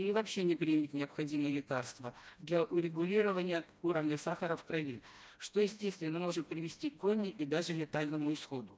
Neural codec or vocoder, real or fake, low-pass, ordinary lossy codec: codec, 16 kHz, 1 kbps, FreqCodec, smaller model; fake; none; none